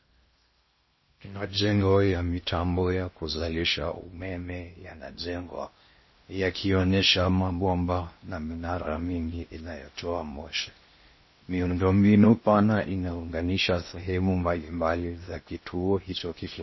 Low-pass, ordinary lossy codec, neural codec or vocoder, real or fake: 7.2 kHz; MP3, 24 kbps; codec, 16 kHz in and 24 kHz out, 0.6 kbps, FocalCodec, streaming, 4096 codes; fake